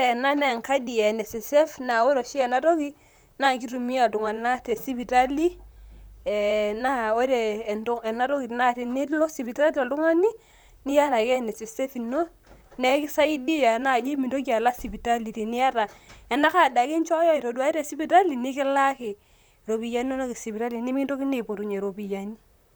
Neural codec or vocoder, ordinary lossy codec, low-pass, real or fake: vocoder, 44.1 kHz, 128 mel bands, Pupu-Vocoder; none; none; fake